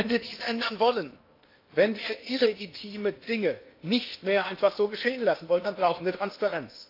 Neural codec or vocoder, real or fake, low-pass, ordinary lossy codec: codec, 16 kHz in and 24 kHz out, 0.8 kbps, FocalCodec, streaming, 65536 codes; fake; 5.4 kHz; AAC, 32 kbps